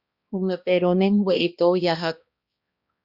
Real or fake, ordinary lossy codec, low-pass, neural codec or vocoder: fake; Opus, 64 kbps; 5.4 kHz; codec, 16 kHz, 1 kbps, X-Codec, HuBERT features, trained on balanced general audio